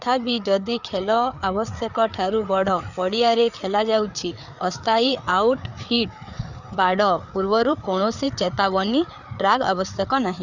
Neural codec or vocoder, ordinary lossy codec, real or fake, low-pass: codec, 16 kHz, 4 kbps, FreqCodec, larger model; none; fake; 7.2 kHz